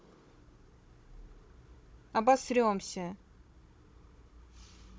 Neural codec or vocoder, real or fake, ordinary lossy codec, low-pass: codec, 16 kHz, 16 kbps, FunCodec, trained on Chinese and English, 50 frames a second; fake; none; none